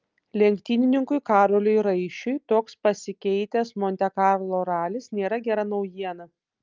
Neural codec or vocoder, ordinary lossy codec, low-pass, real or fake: none; Opus, 24 kbps; 7.2 kHz; real